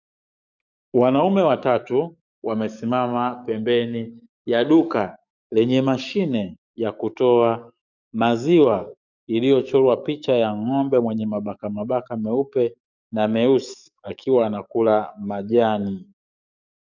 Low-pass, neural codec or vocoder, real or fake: 7.2 kHz; codec, 44.1 kHz, 7.8 kbps, DAC; fake